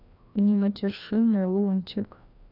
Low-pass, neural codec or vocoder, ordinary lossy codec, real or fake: 5.4 kHz; codec, 16 kHz, 1 kbps, FreqCodec, larger model; none; fake